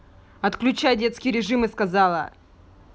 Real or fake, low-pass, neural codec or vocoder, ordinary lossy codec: real; none; none; none